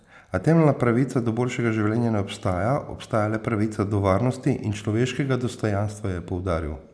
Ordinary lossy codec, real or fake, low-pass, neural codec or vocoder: none; real; none; none